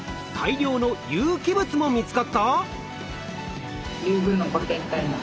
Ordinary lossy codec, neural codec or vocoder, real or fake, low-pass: none; none; real; none